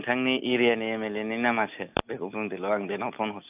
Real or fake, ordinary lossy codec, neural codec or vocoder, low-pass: real; AAC, 32 kbps; none; 3.6 kHz